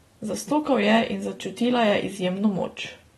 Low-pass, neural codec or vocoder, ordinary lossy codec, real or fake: 19.8 kHz; none; AAC, 32 kbps; real